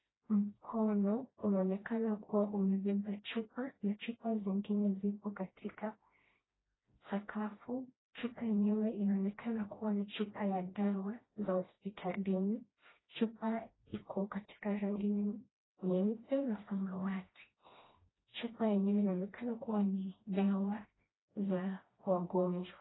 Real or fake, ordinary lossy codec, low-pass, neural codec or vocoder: fake; AAC, 16 kbps; 7.2 kHz; codec, 16 kHz, 1 kbps, FreqCodec, smaller model